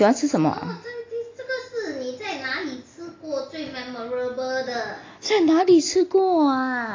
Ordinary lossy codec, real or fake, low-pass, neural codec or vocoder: AAC, 32 kbps; real; 7.2 kHz; none